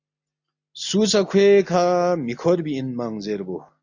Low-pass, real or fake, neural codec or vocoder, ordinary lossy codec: 7.2 kHz; real; none; AAC, 48 kbps